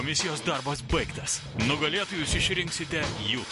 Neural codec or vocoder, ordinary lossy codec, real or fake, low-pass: none; MP3, 48 kbps; real; 14.4 kHz